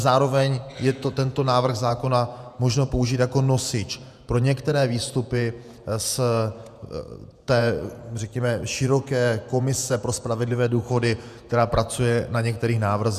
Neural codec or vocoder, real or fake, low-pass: vocoder, 48 kHz, 128 mel bands, Vocos; fake; 14.4 kHz